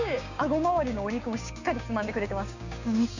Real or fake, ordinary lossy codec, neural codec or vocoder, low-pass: fake; none; codec, 16 kHz, 6 kbps, DAC; 7.2 kHz